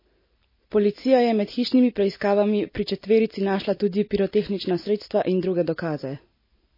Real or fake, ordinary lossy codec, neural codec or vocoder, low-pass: real; MP3, 24 kbps; none; 5.4 kHz